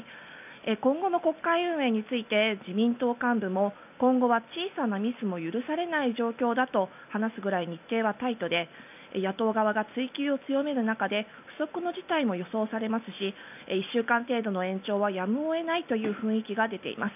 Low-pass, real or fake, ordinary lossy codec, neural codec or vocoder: 3.6 kHz; real; none; none